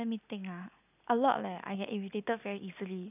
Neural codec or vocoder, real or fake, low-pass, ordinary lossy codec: none; real; 3.6 kHz; none